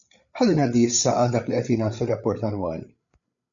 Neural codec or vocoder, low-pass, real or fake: codec, 16 kHz, 8 kbps, FreqCodec, larger model; 7.2 kHz; fake